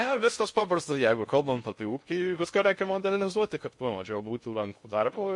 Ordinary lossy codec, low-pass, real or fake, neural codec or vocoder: MP3, 48 kbps; 10.8 kHz; fake; codec, 16 kHz in and 24 kHz out, 0.6 kbps, FocalCodec, streaming, 2048 codes